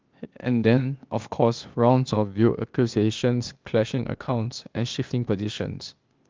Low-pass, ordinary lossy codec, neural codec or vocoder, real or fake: 7.2 kHz; Opus, 32 kbps; codec, 16 kHz, 0.8 kbps, ZipCodec; fake